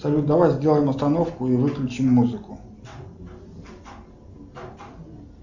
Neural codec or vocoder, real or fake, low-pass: none; real; 7.2 kHz